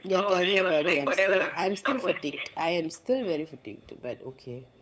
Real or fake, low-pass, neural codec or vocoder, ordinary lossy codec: fake; none; codec, 16 kHz, 8 kbps, FunCodec, trained on LibriTTS, 25 frames a second; none